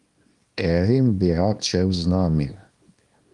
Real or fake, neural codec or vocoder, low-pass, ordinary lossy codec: fake; codec, 24 kHz, 0.9 kbps, WavTokenizer, small release; 10.8 kHz; Opus, 32 kbps